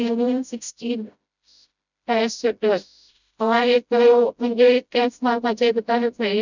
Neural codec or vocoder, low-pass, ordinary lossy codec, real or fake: codec, 16 kHz, 0.5 kbps, FreqCodec, smaller model; 7.2 kHz; none; fake